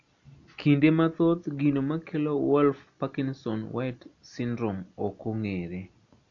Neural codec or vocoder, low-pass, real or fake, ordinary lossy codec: none; 7.2 kHz; real; none